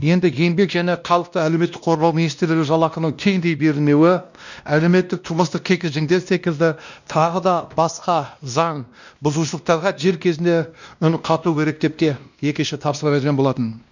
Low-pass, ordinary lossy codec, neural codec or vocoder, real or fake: 7.2 kHz; none; codec, 16 kHz, 1 kbps, X-Codec, WavLM features, trained on Multilingual LibriSpeech; fake